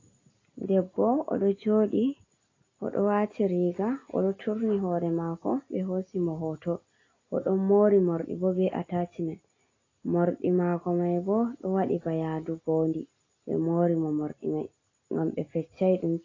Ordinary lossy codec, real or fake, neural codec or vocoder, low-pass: AAC, 32 kbps; real; none; 7.2 kHz